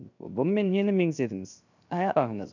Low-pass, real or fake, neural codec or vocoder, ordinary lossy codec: 7.2 kHz; fake; codec, 16 kHz, 0.7 kbps, FocalCodec; none